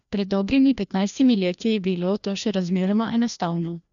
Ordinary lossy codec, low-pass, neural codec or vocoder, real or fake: none; 7.2 kHz; codec, 16 kHz, 1 kbps, FreqCodec, larger model; fake